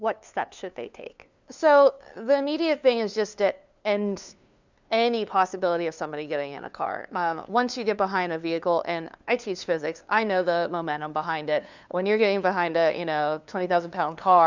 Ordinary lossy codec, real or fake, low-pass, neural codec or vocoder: Opus, 64 kbps; fake; 7.2 kHz; codec, 16 kHz, 2 kbps, FunCodec, trained on LibriTTS, 25 frames a second